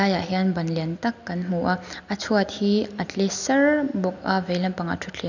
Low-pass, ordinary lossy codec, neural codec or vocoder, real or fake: 7.2 kHz; none; none; real